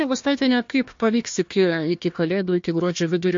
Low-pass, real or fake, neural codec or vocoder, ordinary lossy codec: 7.2 kHz; fake; codec, 16 kHz, 1 kbps, FunCodec, trained on Chinese and English, 50 frames a second; MP3, 48 kbps